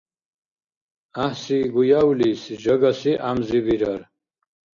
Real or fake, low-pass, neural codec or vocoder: real; 7.2 kHz; none